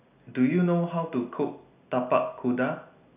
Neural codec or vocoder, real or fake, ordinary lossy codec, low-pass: none; real; none; 3.6 kHz